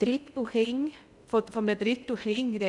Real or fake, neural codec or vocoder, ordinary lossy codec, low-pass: fake; codec, 16 kHz in and 24 kHz out, 0.6 kbps, FocalCodec, streaming, 2048 codes; none; 10.8 kHz